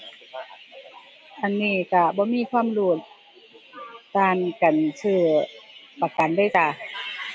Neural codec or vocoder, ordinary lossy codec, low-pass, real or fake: none; none; none; real